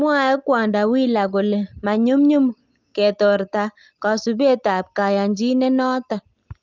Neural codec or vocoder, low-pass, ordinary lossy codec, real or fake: none; 7.2 kHz; Opus, 24 kbps; real